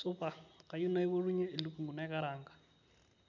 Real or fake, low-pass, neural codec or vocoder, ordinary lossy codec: real; 7.2 kHz; none; MP3, 64 kbps